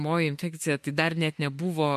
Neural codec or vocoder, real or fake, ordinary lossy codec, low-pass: autoencoder, 48 kHz, 32 numbers a frame, DAC-VAE, trained on Japanese speech; fake; MP3, 64 kbps; 14.4 kHz